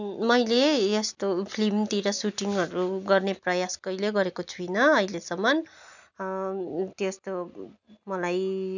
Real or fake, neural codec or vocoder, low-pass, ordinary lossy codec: real; none; 7.2 kHz; none